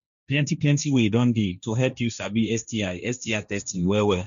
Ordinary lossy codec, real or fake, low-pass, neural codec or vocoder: none; fake; 7.2 kHz; codec, 16 kHz, 1.1 kbps, Voila-Tokenizer